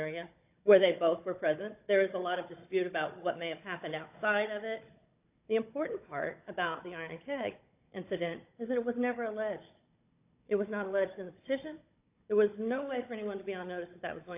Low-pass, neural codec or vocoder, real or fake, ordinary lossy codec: 3.6 kHz; codec, 16 kHz, 16 kbps, FunCodec, trained on Chinese and English, 50 frames a second; fake; AAC, 32 kbps